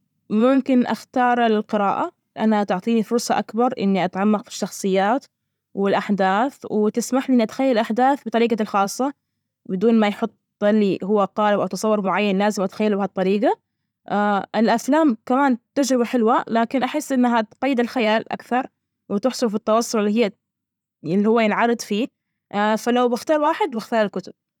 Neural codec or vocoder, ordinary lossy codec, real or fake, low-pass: vocoder, 44.1 kHz, 128 mel bands every 512 samples, BigVGAN v2; none; fake; 19.8 kHz